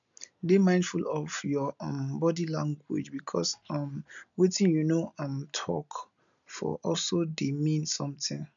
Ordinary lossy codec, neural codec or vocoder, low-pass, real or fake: none; none; 7.2 kHz; real